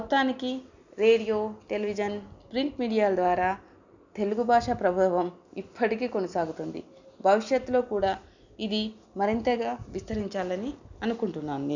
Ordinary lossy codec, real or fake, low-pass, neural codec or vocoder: none; real; 7.2 kHz; none